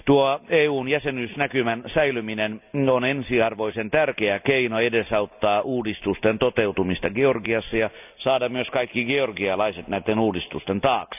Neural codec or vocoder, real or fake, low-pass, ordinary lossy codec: none; real; 3.6 kHz; none